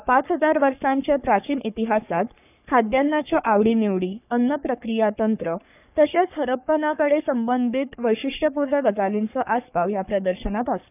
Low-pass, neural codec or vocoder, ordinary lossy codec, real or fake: 3.6 kHz; codec, 44.1 kHz, 3.4 kbps, Pupu-Codec; none; fake